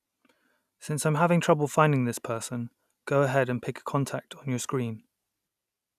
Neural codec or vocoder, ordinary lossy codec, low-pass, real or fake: none; none; 14.4 kHz; real